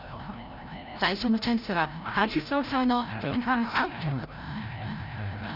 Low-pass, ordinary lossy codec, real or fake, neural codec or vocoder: 5.4 kHz; none; fake; codec, 16 kHz, 0.5 kbps, FreqCodec, larger model